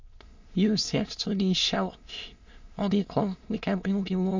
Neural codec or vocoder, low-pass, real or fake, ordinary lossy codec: autoencoder, 22.05 kHz, a latent of 192 numbers a frame, VITS, trained on many speakers; 7.2 kHz; fake; MP3, 48 kbps